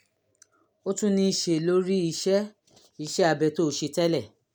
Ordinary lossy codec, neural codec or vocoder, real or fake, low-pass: none; none; real; none